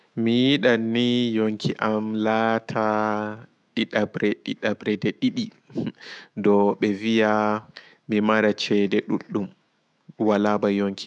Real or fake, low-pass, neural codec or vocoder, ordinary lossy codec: fake; 10.8 kHz; autoencoder, 48 kHz, 128 numbers a frame, DAC-VAE, trained on Japanese speech; none